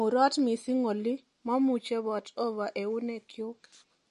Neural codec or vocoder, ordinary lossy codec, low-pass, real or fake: none; MP3, 48 kbps; 14.4 kHz; real